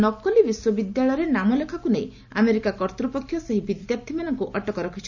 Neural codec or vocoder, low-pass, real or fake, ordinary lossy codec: none; 7.2 kHz; real; none